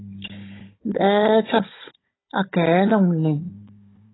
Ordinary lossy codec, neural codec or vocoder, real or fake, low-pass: AAC, 16 kbps; codec, 16 kHz, 16 kbps, FunCodec, trained on Chinese and English, 50 frames a second; fake; 7.2 kHz